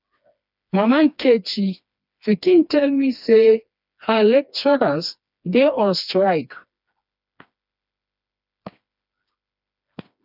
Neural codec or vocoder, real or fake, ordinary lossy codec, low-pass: codec, 16 kHz, 2 kbps, FreqCodec, smaller model; fake; none; 5.4 kHz